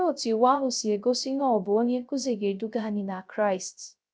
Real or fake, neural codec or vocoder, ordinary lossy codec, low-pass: fake; codec, 16 kHz, 0.3 kbps, FocalCodec; none; none